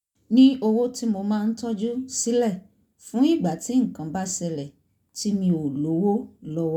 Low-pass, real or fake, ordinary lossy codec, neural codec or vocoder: 19.8 kHz; real; none; none